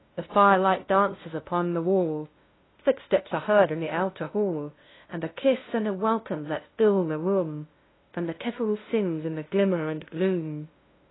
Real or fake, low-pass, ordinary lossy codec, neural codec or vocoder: fake; 7.2 kHz; AAC, 16 kbps; codec, 16 kHz, 0.5 kbps, FunCodec, trained on LibriTTS, 25 frames a second